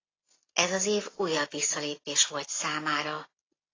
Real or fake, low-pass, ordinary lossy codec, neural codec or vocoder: real; 7.2 kHz; AAC, 32 kbps; none